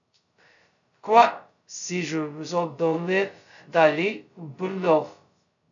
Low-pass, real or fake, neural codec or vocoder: 7.2 kHz; fake; codec, 16 kHz, 0.2 kbps, FocalCodec